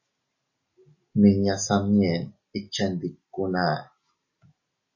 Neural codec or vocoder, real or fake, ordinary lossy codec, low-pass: none; real; MP3, 32 kbps; 7.2 kHz